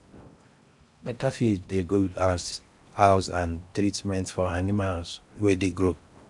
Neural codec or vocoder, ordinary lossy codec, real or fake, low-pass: codec, 16 kHz in and 24 kHz out, 0.6 kbps, FocalCodec, streaming, 4096 codes; none; fake; 10.8 kHz